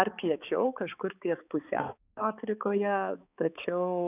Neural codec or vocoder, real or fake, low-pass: codec, 16 kHz, 4 kbps, X-Codec, HuBERT features, trained on balanced general audio; fake; 3.6 kHz